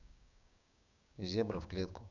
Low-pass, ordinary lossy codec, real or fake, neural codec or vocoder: 7.2 kHz; none; fake; codec, 16 kHz, 6 kbps, DAC